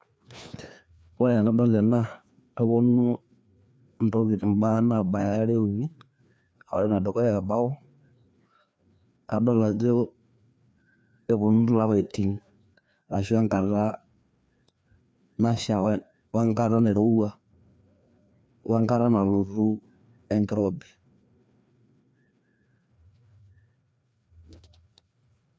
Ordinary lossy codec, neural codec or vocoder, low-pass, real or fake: none; codec, 16 kHz, 2 kbps, FreqCodec, larger model; none; fake